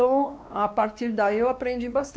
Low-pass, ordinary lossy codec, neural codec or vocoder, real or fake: none; none; codec, 16 kHz, 2 kbps, X-Codec, WavLM features, trained on Multilingual LibriSpeech; fake